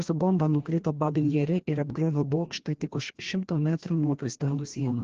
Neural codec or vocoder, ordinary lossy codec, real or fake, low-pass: codec, 16 kHz, 1 kbps, FreqCodec, larger model; Opus, 16 kbps; fake; 7.2 kHz